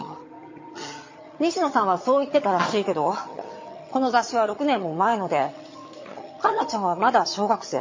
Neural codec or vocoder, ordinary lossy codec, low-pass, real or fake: vocoder, 22.05 kHz, 80 mel bands, HiFi-GAN; MP3, 32 kbps; 7.2 kHz; fake